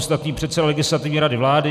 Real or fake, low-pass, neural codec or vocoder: real; 14.4 kHz; none